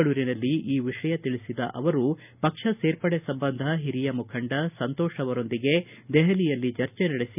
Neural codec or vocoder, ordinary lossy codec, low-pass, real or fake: none; none; 3.6 kHz; real